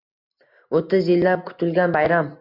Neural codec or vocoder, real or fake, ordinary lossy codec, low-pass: none; real; MP3, 48 kbps; 5.4 kHz